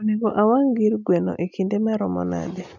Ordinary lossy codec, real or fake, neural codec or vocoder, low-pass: none; real; none; 7.2 kHz